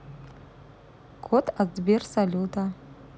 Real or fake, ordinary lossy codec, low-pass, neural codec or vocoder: real; none; none; none